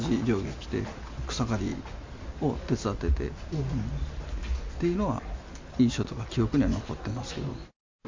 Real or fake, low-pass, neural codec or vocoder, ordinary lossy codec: real; 7.2 kHz; none; MP3, 64 kbps